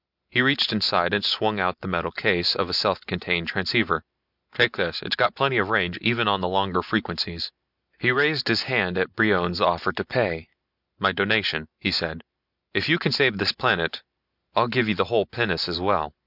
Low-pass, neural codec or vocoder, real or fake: 5.4 kHz; none; real